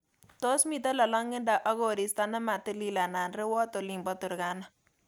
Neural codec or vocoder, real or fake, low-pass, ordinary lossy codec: none; real; none; none